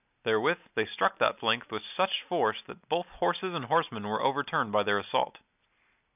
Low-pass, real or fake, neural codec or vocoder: 3.6 kHz; real; none